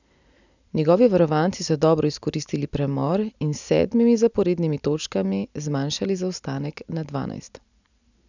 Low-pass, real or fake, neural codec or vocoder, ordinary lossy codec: 7.2 kHz; real; none; none